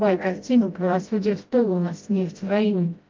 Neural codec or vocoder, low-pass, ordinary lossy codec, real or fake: codec, 16 kHz, 0.5 kbps, FreqCodec, smaller model; 7.2 kHz; Opus, 24 kbps; fake